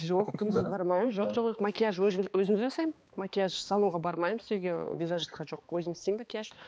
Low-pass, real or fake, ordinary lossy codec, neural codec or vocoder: none; fake; none; codec, 16 kHz, 2 kbps, X-Codec, HuBERT features, trained on balanced general audio